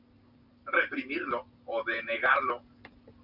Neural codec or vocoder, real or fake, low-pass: none; real; 5.4 kHz